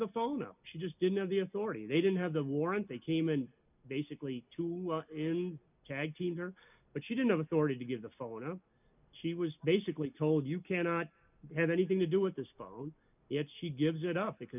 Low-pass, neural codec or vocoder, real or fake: 3.6 kHz; none; real